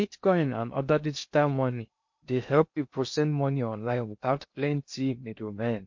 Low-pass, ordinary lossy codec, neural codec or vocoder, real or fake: 7.2 kHz; MP3, 48 kbps; codec, 16 kHz in and 24 kHz out, 0.6 kbps, FocalCodec, streaming, 2048 codes; fake